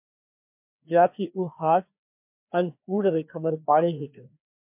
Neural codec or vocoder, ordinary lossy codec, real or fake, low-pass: codec, 16 kHz, 2 kbps, FreqCodec, larger model; MP3, 32 kbps; fake; 3.6 kHz